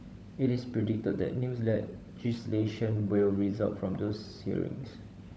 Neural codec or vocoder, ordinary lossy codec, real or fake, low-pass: codec, 16 kHz, 16 kbps, FunCodec, trained on LibriTTS, 50 frames a second; none; fake; none